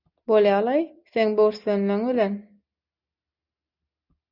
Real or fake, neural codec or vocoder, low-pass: real; none; 5.4 kHz